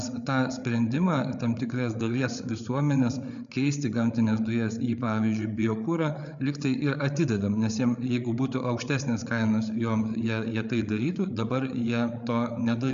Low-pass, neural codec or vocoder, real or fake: 7.2 kHz; codec, 16 kHz, 8 kbps, FreqCodec, larger model; fake